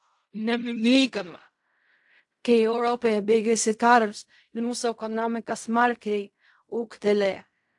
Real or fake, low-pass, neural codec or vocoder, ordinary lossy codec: fake; 10.8 kHz; codec, 16 kHz in and 24 kHz out, 0.4 kbps, LongCat-Audio-Codec, fine tuned four codebook decoder; AAC, 64 kbps